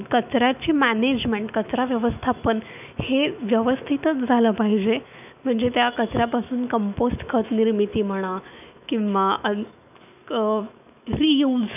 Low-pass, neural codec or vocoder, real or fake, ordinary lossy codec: 3.6 kHz; autoencoder, 48 kHz, 128 numbers a frame, DAC-VAE, trained on Japanese speech; fake; none